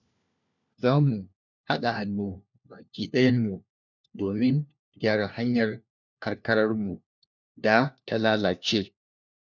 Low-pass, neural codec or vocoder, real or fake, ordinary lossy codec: 7.2 kHz; codec, 16 kHz, 1 kbps, FunCodec, trained on LibriTTS, 50 frames a second; fake; none